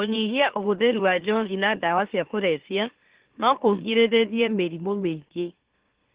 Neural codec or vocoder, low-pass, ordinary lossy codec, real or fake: autoencoder, 44.1 kHz, a latent of 192 numbers a frame, MeloTTS; 3.6 kHz; Opus, 16 kbps; fake